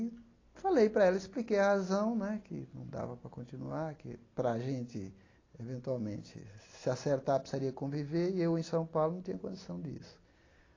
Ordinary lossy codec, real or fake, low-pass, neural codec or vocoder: AAC, 32 kbps; real; 7.2 kHz; none